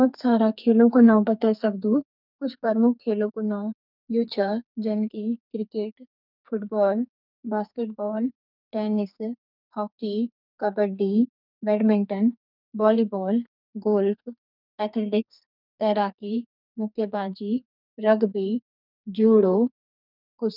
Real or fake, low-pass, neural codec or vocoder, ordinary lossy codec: fake; 5.4 kHz; codec, 44.1 kHz, 2.6 kbps, SNAC; none